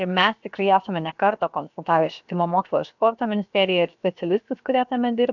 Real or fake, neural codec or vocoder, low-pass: fake; codec, 16 kHz, about 1 kbps, DyCAST, with the encoder's durations; 7.2 kHz